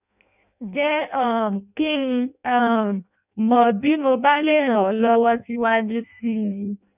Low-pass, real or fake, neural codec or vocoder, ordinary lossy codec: 3.6 kHz; fake; codec, 16 kHz in and 24 kHz out, 0.6 kbps, FireRedTTS-2 codec; none